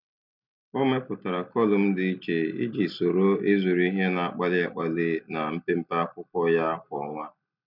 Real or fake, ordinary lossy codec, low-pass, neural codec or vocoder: real; none; 5.4 kHz; none